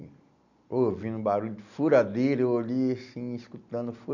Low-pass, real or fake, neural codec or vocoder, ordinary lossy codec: 7.2 kHz; real; none; none